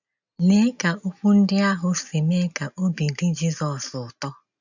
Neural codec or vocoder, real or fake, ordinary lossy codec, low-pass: none; real; none; 7.2 kHz